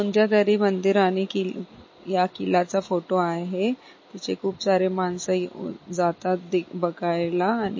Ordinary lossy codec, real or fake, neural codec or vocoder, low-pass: MP3, 32 kbps; real; none; 7.2 kHz